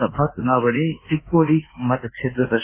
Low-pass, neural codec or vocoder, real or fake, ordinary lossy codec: 3.6 kHz; codec, 24 kHz, 1.2 kbps, DualCodec; fake; AAC, 24 kbps